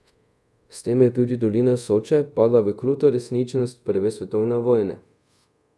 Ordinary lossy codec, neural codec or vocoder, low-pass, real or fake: none; codec, 24 kHz, 0.5 kbps, DualCodec; none; fake